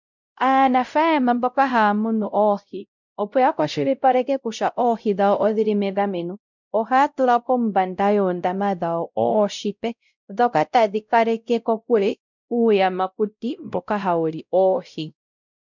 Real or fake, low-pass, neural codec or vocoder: fake; 7.2 kHz; codec, 16 kHz, 0.5 kbps, X-Codec, WavLM features, trained on Multilingual LibriSpeech